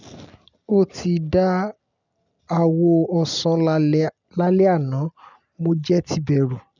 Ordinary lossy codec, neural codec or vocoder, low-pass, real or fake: none; none; 7.2 kHz; real